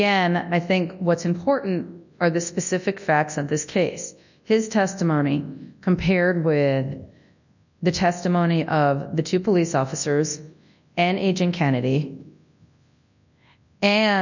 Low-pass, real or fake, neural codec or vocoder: 7.2 kHz; fake; codec, 24 kHz, 0.9 kbps, WavTokenizer, large speech release